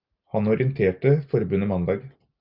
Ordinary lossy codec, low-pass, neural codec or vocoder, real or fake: Opus, 24 kbps; 5.4 kHz; none; real